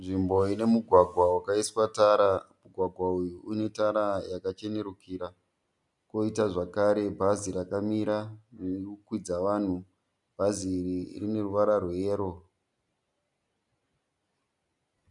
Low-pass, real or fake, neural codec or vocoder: 10.8 kHz; real; none